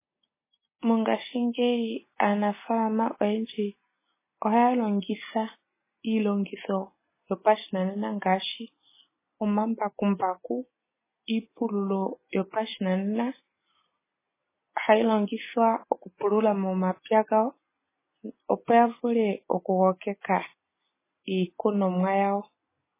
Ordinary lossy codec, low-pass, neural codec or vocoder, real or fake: MP3, 16 kbps; 3.6 kHz; none; real